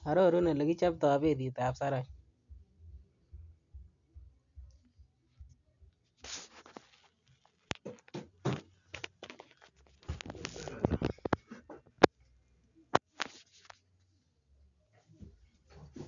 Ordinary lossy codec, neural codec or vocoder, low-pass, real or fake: none; none; 7.2 kHz; real